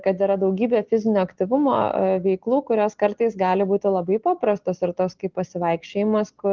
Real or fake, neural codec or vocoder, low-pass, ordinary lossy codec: real; none; 7.2 kHz; Opus, 16 kbps